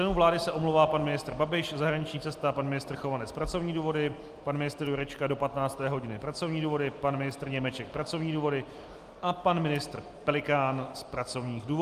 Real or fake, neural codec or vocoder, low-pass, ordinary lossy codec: real; none; 14.4 kHz; Opus, 32 kbps